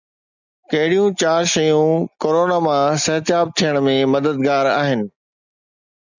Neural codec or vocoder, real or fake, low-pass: none; real; 7.2 kHz